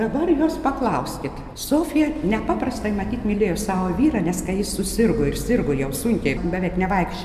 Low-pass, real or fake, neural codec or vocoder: 14.4 kHz; real; none